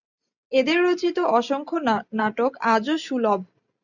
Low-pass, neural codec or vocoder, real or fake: 7.2 kHz; none; real